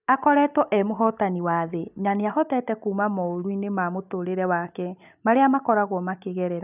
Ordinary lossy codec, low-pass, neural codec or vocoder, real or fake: none; 3.6 kHz; none; real